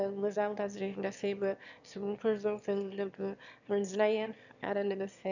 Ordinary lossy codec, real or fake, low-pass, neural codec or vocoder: none; fake; 7.2 kHz; autoencoder, 22.05 kHz, a latent of 192 numbers a frame, VITS, trained on one speaker